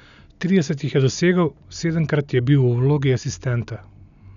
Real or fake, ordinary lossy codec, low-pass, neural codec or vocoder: real; none; 7.2 kHz; none